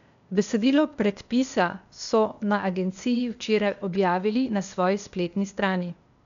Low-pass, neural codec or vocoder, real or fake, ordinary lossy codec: 7.2 kHz; codec, 16 kHz, 0.8 kbps, ZipCodec; fake; none